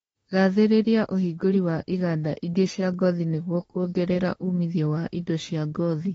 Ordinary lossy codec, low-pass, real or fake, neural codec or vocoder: AAC, 24 kbps; 19.8 kHz; fake; autoencoder, 48 kHz, 32 numbers a frame, DAC-VAE, trained on Japanese speech